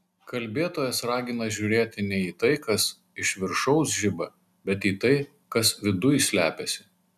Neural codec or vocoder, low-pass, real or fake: none; 14.4 kHz; real